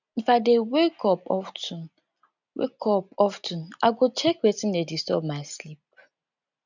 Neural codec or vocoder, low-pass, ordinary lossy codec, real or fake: none; 7.2 kHz; none; real